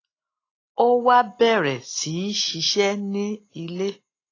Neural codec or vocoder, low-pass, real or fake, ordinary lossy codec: none; 7.2 kHz; real; AAC, 32 kbps